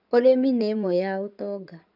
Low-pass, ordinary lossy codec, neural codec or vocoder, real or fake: 5.4 kHz; none; codec, 16 kHz in and 24 kHz out, 2.2 kbps, FireRedTTS-2 codec; fake